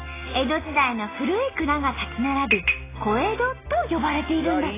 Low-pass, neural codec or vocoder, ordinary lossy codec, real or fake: 3.6 kHz; none; AAC, 16 kbps; real